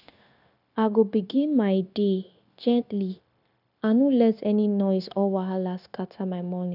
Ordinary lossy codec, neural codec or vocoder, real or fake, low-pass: none; codec, 16 kHz, 0.9 kbps, LongCat-Audio-Codec; fake; 5.4 kHz